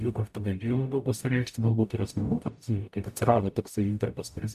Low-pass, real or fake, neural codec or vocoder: 14.4 kHz; fake; codec, 44.1 kHz, 0.9 kbps, DAC